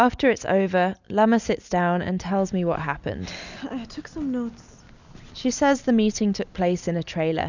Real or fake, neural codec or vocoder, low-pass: real; none; 7.2 kHz